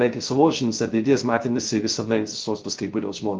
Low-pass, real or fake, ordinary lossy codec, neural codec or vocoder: 7.2 kHz; fake; Opus, 24 kbps; codec, 16 kHz, 0.3 kbps, FocalCodec